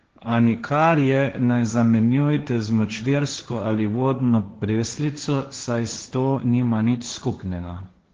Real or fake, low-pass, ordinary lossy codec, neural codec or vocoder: fake; 7.2 kHz; Opus, 16 kbps; codec, 16 kHz, 1.1 kbps, Voila-Tokenizer